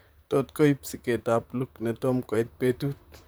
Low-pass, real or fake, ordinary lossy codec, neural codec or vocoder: none; fake; none; vocoder, 44.1 kHz, 128 mel bands, Pupu-Vocoder